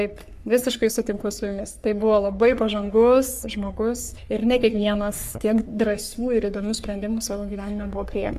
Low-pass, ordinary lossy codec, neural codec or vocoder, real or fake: 14.4 kHz; AAC, 96 kbps; codec, 44.1 kHz, 3.4 kbps, Pupu-Codec; fake